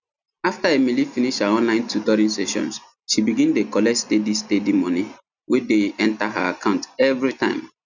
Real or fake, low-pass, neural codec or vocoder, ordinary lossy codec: real; 7.2 kHz; none; none